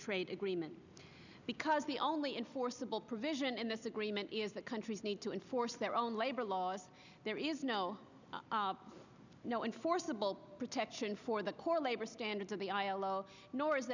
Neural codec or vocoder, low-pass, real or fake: none; 7.2 kHz; real